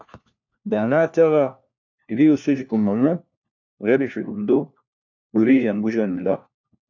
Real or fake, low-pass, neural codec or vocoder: fake; 7.2 kHz; codec, 16 kHz, 1 kbps, FunCodec, trained on LibriTTS, 50 frames a second